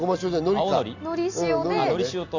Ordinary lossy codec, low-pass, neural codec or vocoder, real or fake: Opus, 64 kbps; 7.2 kHz; none; real